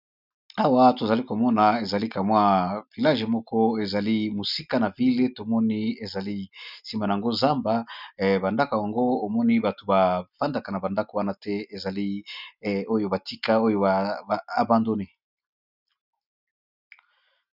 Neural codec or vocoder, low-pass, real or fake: none; 5.4 kHz; real